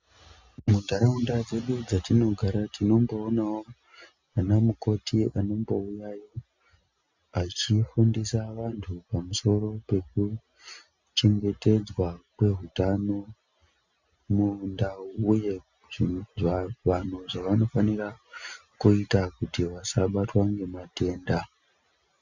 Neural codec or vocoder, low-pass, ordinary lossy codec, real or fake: none; 7.2 kHz; Opus, 64 kbps; real